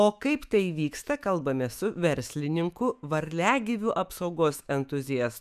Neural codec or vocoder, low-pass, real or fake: autoencoder, 48 kHz, 128 numbers a frame, DAC-VAE, trained on Japanese speech; 14.4 kHz; fake